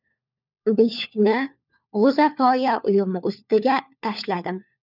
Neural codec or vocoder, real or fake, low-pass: codec, 16 kHz, 4 kbps, FunCodec, trained on LibriTTS, 50 frames a second; fake; 5.4 kHz